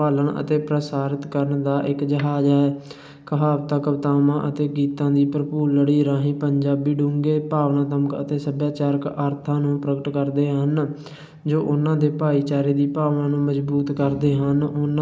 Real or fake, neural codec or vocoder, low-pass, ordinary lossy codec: real; none; none; none